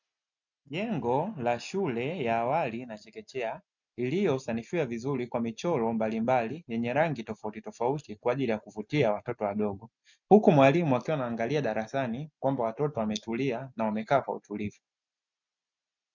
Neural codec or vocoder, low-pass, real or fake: none; 7.2 kHz; real